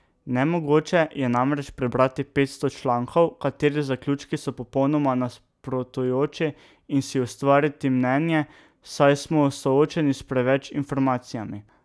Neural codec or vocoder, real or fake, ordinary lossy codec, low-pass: none; real; none; none